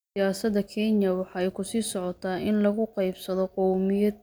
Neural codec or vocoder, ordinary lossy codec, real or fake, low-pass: none; none; real; none